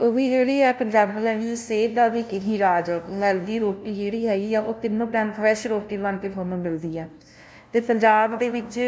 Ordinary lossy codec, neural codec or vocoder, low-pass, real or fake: none; codec, 16 kHz, 0.5 kbps, FunCodec, trained on LibriTTS, 25 frames a second; none; fake